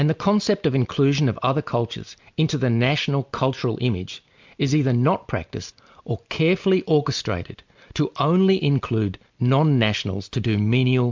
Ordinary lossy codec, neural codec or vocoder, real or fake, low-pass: MP3, 64 kbps; none; real; 7.2 kHz